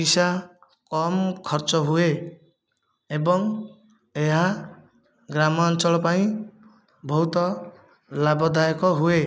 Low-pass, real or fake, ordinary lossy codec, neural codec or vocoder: none; real; none; none